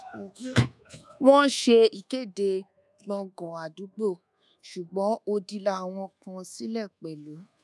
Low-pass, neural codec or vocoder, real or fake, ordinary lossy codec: none; codec, 24 kHz, 1.2 kbps, DualCodec; fake; none